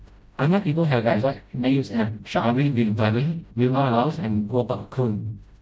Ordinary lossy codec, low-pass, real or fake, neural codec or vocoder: none; none; fake; codec, 16 kHz, 0.5 kbps, FreqCodec, smaller model